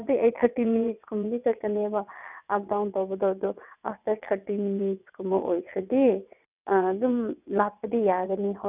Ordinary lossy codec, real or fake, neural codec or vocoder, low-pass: none; fake; vocoder, 22.05 kHz, 80 mel bands, WaveNeXt; 3.6 kHz